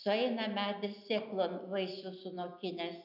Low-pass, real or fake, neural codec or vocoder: 5.4 kHz; real; none